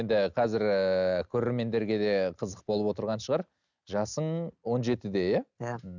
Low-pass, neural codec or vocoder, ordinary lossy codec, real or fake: 7.2 kHz; none; none; real